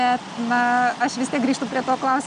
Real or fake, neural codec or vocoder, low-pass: real; none; 9.9 kHz